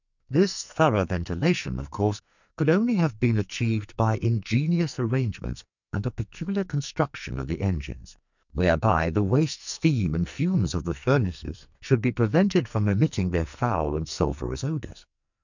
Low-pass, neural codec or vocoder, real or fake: 7.2 kHz; codec, 44.1 kHz, 2.6 kbps, SNAC; fake